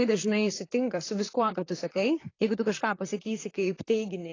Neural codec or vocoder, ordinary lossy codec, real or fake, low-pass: vocoder, 44.1 kHz, 128 mel bands, Pupu-Vocoder; AAC, 32 kbps; fake; 7.2 kHz